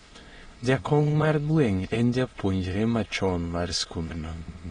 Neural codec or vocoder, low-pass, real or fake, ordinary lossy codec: autoencoder, 22.05 kHz, a latent of 192 numbers a frame, VITS, trained on many speakers; 9.9 kHz; fake; AAC, 32 kbps